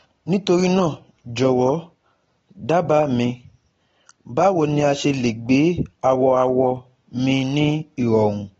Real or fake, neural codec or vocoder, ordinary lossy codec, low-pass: real; none; AAC, 24 kbps; 19.8 kHz